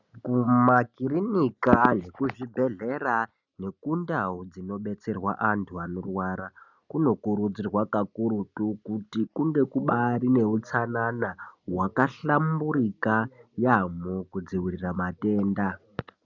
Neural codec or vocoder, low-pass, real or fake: none; 7.2 kHz; real